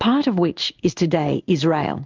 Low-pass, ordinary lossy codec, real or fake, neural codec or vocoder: 7.2 kHz; Opus, 24 kbps; fake; codec, 16 kHz in and 24 kHz out, 1 kbps, XY-Tokenizer